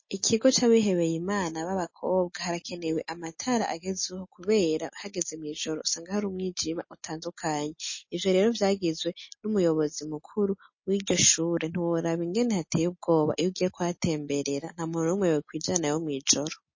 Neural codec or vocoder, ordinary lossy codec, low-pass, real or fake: none; MP3, 32 kbps; 7.2 kHz; real